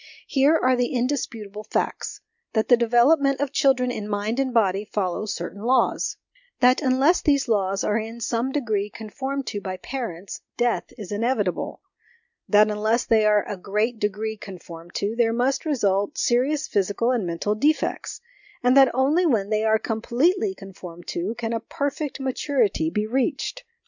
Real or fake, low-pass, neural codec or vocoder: real; 7.2 kHz; none